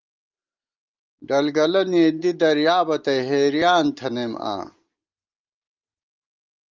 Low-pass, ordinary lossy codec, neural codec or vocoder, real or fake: 7.2 kHz; Opus, 32 kbps; none; real